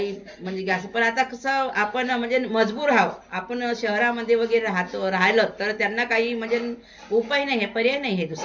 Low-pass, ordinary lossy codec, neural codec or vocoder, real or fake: 7.2 kHz; MP3, 64 kbps; none; real